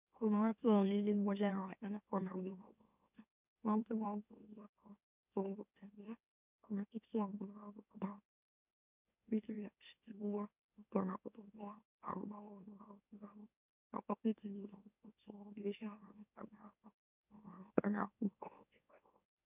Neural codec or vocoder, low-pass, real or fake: autoencoder, 44.1 kHz, a latent of 192 numbers a frame, MeloTTS; 3.6 kHz; fake